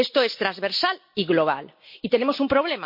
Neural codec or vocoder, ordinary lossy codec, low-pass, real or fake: none; MP3, 32 kbps; 5.4 kHz; real